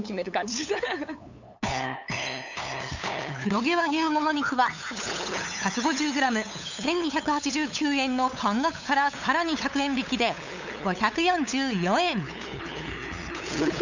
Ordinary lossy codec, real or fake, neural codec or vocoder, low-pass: none; fake; codec, 16 kHz, 8 kbps, FunCodec, trained on LibriTTS, 25 frames a second; 7.2 kHz